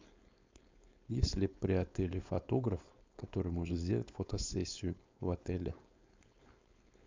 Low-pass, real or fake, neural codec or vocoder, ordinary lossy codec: 7.2 kHz; fake; codec, 16 kHz, 4.8 kbps, FACodec; MP3, 64 kbps